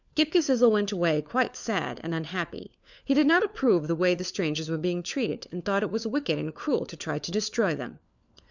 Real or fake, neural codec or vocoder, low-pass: fake; codec, 16 kHz, 4 kbps, FunCodec, trained on LibriTTS, 50 frames a second; 7.2 kHz